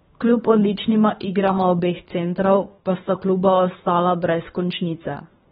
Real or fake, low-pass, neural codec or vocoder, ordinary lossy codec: fake; 10.8 kHz; codec, 24 kHz, 3 kbps, HILCodec; AAC, 16 kbps